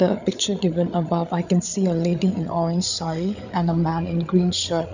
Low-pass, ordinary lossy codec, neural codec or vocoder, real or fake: 7.2 kHz; none; codec, 16 kHz, 8 kbps, FreqCodec, larger model; fake